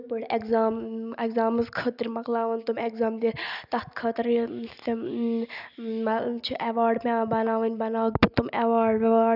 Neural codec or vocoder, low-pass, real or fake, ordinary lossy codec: none; 5.4 kHz; real; none